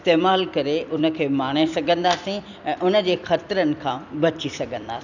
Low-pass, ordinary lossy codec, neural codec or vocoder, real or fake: 7.2 kHz; none; vocoder, 44.1 kHz, 128 mel bands every 256 samples, BigVGAN v2; fake